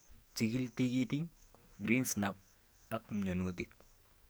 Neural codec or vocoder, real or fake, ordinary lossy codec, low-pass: codec, 44.1 kHz, 2.6 kbps, SNAC; fake; none; none